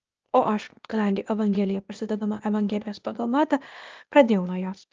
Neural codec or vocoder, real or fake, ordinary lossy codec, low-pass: codec, 16 kHz, 0.8 kbps, ZipCodec; fake; Opus, 24 kbps; 7.2 kHz